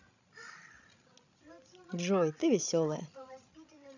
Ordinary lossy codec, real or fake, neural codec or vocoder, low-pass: none; fake; codec, 16 kHz, 16 kbps, FreqCodec, larger model; 7.2 kHz